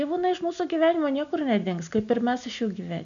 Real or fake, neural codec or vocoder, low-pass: real; none; 7.2 kHz